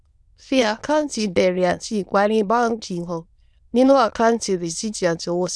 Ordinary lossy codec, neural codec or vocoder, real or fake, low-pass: none; autoencoder, 22.05 kHz, a latent of 192 numbers a frame, VITS, trained on many speakers; fake; none